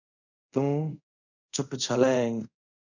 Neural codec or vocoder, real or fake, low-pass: codec, 24 kHz, 0.5 kbps, DualCodec; fake; 7.2 kHz